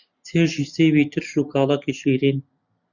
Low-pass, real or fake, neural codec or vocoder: 7.2 kHz; real; none